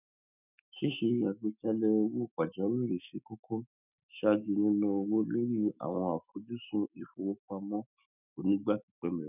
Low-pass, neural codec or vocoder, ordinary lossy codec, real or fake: 3.6 kHz; autoencoder, 48 kHz, 128 numbers a frame, DAC-VAE, trained on Japanese speech; none; fake